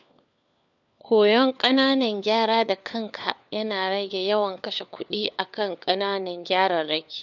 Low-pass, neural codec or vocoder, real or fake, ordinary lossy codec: 7.2 kHz; codec, 16 kHz, 4 kbps, FunCodec, trained on LibriTTS, 50 frames a second; fake; none